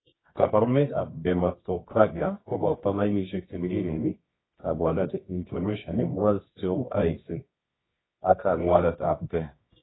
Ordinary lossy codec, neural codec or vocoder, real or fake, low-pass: AAC, 16 kbps; codec, 24 kHz, 0.9 kbps, WavTokenizer, medium music audio release; fake; 7.2 kHz